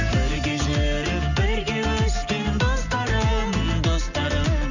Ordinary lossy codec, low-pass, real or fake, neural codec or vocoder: none; 7.2 kHz; real; none